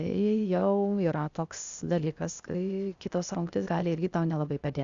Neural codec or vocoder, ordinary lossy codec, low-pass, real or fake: codec, 16 kHz, 0.8 kbps, ZipCodec; Opus, 64 kbps; 7.2 kHz; fake